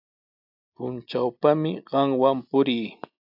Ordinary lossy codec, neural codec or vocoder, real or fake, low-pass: AAC, 48 kbps; none; real; 5.4 kHz